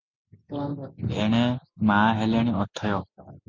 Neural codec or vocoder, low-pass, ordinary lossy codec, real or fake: none; 7.2 kHz; MP3, 64 kbps; real